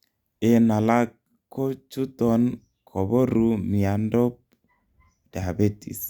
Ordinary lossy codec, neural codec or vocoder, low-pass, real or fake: Opus, 64 kbps; none; 19.8 kHz; real